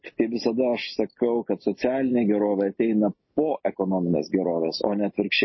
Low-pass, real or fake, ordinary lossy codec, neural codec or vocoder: 7.2 kHz; real; MP3, 24 kbps; none